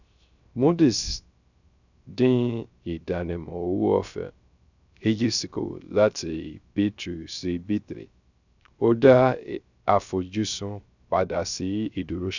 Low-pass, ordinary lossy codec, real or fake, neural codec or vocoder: 7.2 kHz; none; fake; codec, 16 kHz, 0.3 kbps, FocalCodec